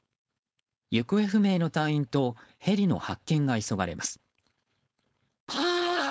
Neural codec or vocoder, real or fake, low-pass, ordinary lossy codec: codec, 16 kHz, 4.8 kbps, FACodec; fake; none; none